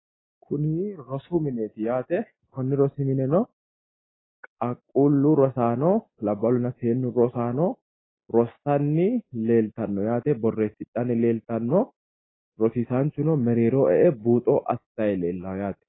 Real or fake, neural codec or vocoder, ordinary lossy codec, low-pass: real; none; AAC, 16 kbps; 7.2 kHz